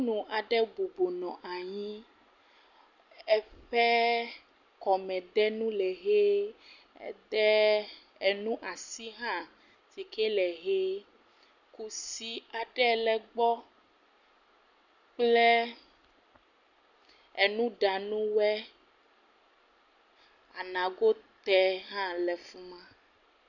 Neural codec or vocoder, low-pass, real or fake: none; 7.2 kHz; real